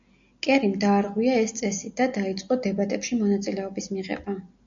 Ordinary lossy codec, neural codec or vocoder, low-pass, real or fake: AAC, 64 kbps; none; 7.2 kHz; real